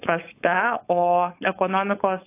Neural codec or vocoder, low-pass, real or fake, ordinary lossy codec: codec, 16 kHz, 4.8 kbps, FACodec; 3.6 kHz; fake; AAC, 24 kbps